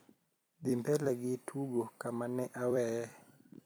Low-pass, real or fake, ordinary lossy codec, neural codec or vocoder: none; fake; none; vocoder, 44.1 kHz, 128 mel bands every 256 samples, BigVGAN v2